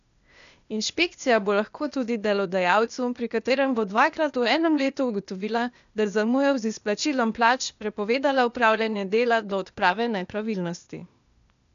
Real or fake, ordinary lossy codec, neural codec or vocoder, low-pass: fake; none; codec, 16 kHz, 0.8 kbps, ZipCodec; 7.2 kHz